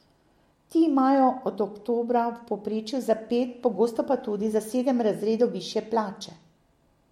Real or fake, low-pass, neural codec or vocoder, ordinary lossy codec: real; 19.8 kHz; none; MP3, 64 kbps